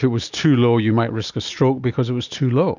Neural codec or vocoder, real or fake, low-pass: none; real; 7.2 kHz